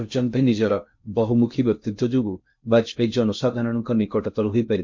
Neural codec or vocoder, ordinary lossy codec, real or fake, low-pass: codec, 16 kHz in and 24 kHz out, 0.6 kbps, FocalCodec, streaming, 2048 codes; MP3, 48 kbps; fake; 7.2 kHz